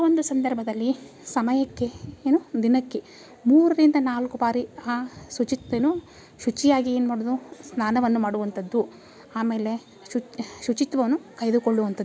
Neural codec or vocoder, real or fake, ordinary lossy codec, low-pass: none; real; none; none